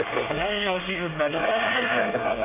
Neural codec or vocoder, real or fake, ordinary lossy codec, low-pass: codec, 24 kHz, 1 kbps, SNAC; fake; none; 3.6 kHz